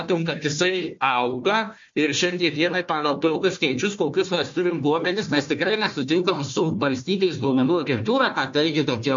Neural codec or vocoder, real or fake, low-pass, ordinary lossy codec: codec, 16 kHz, 1 kbps, FunCodec, trained on Chinese and English, 50 frames a second; fake; 7.2 kHz; MP3, 48 kbps